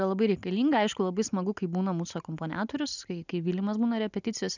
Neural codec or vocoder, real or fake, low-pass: none; real; 7.2 kHz